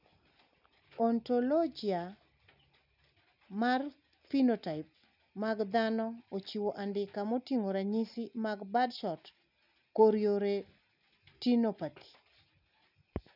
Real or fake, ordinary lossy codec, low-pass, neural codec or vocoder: real; none; 5.4 kHz; none